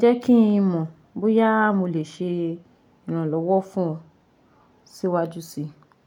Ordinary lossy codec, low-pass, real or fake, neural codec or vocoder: none; 19.8 kHz; real; none